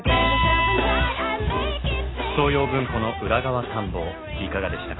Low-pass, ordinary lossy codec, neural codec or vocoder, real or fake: 7.2 kHz; AAC, 16 kbps; none; real